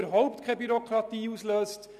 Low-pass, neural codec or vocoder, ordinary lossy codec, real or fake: 14.4 kHz; none; none; real